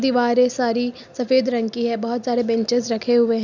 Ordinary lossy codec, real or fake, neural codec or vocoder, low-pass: none; real; none; 7.2 kHz